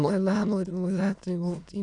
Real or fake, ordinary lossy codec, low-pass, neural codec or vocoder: fake; none; 9.9 kHz; autoencoder, 22.05 kHz, a latent of 192 numbers a frame, VITS, trained on many speakers